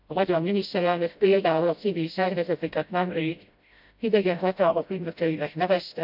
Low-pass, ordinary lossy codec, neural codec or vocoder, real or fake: 5.4 kHz; AAC, 48 kbps; codec, 16 kHz, 0.5 kbps, FreqCodec, smaller model; fake